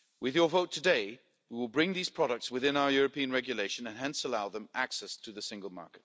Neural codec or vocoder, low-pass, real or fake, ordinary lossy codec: none; none; real; none